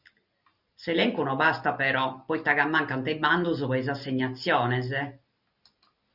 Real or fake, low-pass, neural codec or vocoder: real; 5.4 kHz; none